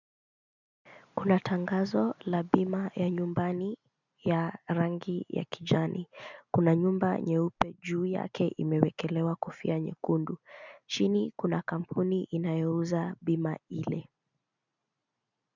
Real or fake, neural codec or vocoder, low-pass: real; none; 7.2 kHz